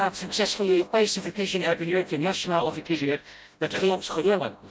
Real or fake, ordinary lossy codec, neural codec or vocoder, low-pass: fake; none; codec, 16 kHz, 0.5 kbps, FreqCodec, smaller model; none